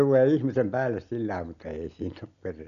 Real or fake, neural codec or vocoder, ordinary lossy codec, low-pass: real; none; none; 7.2 kHz